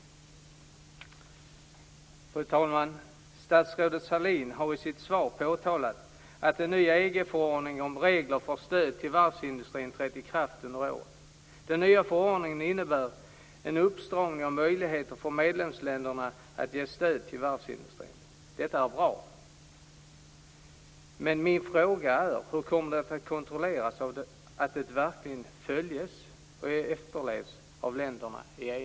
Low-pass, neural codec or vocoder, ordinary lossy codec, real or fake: none; none; none; real